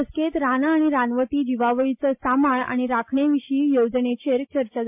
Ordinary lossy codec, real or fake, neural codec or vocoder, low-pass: MP3, 32 kbps; real; none; 3.6 kHz